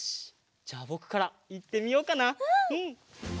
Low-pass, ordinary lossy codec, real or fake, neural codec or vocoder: none; none; real; none